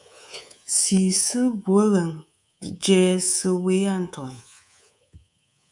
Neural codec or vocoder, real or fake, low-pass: codec, 24 kHz, 3.1 kbps, DualCodec; fake; 10.8 kHz